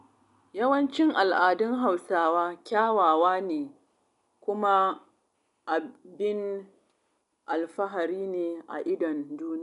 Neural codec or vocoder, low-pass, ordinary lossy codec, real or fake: none; 10.8 kHz; none; real